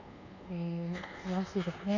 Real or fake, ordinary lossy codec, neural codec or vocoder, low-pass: fake; none; codec, 24 kHz, 1.2 kbps, DualCodec; 7.2 kHz